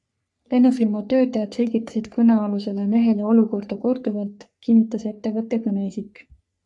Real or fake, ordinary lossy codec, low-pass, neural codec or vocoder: fake; MP3, 96 kbps; 10.8 kHz; codec, 44.1 kHz, 3.4 kbps, Pupu-Codec